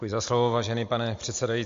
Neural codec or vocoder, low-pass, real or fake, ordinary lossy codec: none; 7.2 kHz; real; MP3, 48 kbps